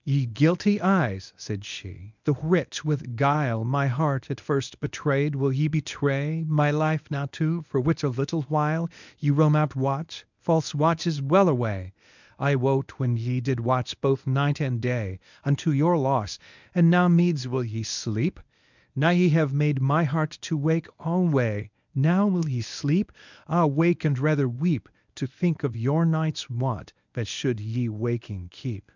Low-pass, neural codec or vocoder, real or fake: 7.2 kHz; codec, 24 kHz, 0.9 kbps, WavTokenizer, medium speech release version 1; fake